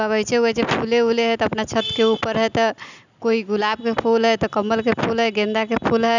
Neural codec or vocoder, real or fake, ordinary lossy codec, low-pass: none; real; none; 7.2 kHz